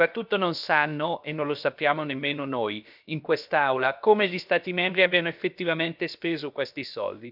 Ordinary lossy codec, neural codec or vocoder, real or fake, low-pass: none; codec, 16 kHz, about 1 kbps, DyCAST, with the encoder's durations; fake; 5.4 kHz